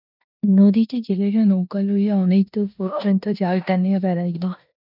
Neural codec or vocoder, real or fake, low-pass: codec, 16 kHz in and 24 kHz out, 0.9 kbps, LongCat-Audio-Codec, four codebook decoder; fake; 5.4 kHz